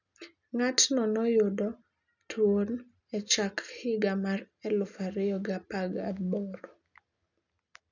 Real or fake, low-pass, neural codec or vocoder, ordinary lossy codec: real; 7.2 kHz; none; none